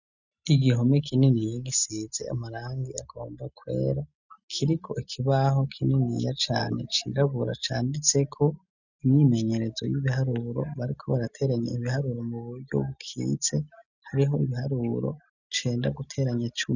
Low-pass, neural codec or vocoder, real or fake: 7.2 kHz; none; real